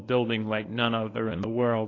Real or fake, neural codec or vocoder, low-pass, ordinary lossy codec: fake; codec, 24 kHz, 0.9 kbps, WavTokenizer, medium speech release version 1; 7.2 kHz; AAC, 32 kbps